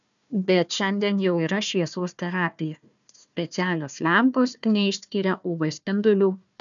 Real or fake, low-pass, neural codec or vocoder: fake; 7.2 kHz; codec, 16 kHz, 1 kbps, FunCodec, trained on Chinese and English, 50 frames a second